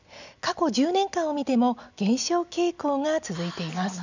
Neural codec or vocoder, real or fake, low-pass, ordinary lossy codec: none; real; 7.2 kHz; none